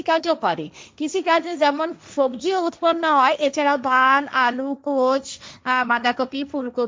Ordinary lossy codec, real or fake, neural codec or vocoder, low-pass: none; fake; codec, 16 kHz, 1.1 kbps, Voila-Tokenizer; none